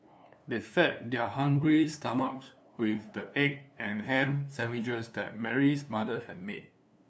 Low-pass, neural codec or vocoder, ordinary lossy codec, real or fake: none; codec, 16 kHz, 2 kbps, FunCodec, trained on LibriTTS, 25 frames a second; none; fake